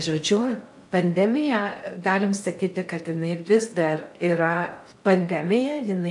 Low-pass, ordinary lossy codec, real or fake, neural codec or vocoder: 10.8 kHz; AAC, 64 kbps; fake; codec, 16 kHz in and 24 kHz out, 0.6 kbps, FocalCodec, streaming, 4096 codes